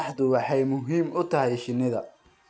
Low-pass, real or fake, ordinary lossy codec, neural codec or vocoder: none; real; none; none